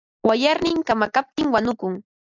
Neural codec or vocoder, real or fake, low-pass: none; real; 7.2 kHz